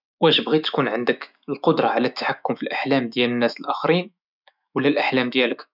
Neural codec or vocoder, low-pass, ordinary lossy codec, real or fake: autoencoder, 48 kHz, 128 numbers a frame, DAC-VAE, trained on Japanese speech; 5.4 kHz; none; fake